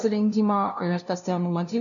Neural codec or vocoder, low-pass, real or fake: codec, 16 kHz, 0.5 kbps, FunCodec, trained on LibriTTS, 25 frames a second; 7.2 kHz; fake